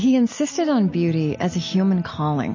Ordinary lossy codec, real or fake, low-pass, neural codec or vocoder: MP3, 32 kbps; real; 7.2 kHz; none